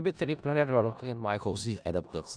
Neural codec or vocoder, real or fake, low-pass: codec, 16 kHz in and 24 kHz out, 0.4 kbps, LongCat-Audio-Codec, four codebook decoder; fake; 9.9 kHz